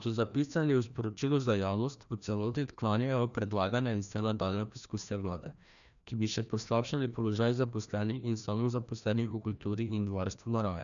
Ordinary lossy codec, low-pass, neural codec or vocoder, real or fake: none; 7.2 kHz; codec, 16 kHz, 1 kbps, FreqCodec, larger model; fake